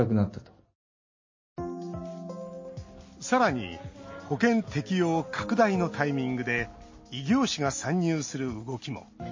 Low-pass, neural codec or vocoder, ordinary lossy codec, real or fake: 7.2 kHz; none; MP3, 32 kbps; real